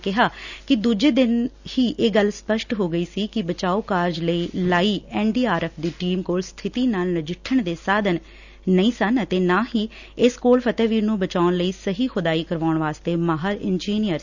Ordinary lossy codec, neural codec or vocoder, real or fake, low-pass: none; none; real; 7.2 kHz